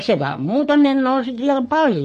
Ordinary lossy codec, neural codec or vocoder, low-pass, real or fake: MP3, 48 kbps; codec, 44.1 kHz, 3.4 kbps, Pupu-Codec; 14.4 kHz; fake